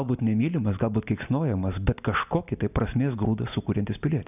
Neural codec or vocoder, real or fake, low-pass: none; real; 3.6 kHz